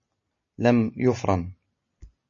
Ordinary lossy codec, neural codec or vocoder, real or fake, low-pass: MP3, 32 kbps; none; real; 7.2 kHz